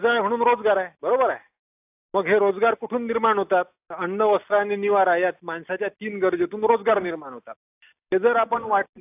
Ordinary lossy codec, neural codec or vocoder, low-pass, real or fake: AAC, 32 kbps; none; 3.6 kHz; real